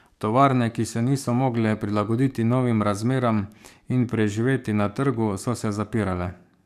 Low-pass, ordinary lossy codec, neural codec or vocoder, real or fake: 14.4 kHz; none; codec, 44.1 kHz, 7.8 kbps, DAC; fake